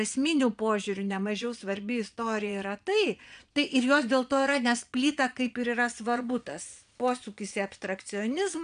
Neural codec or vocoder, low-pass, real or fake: vocoder, 22.05 kHz, 80 mel bands, Vocos; 9.9 kHz; fake